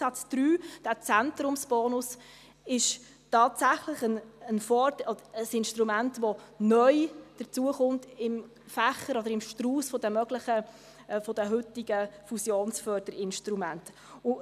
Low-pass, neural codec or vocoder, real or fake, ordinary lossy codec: 14.4 kHz; none; real; none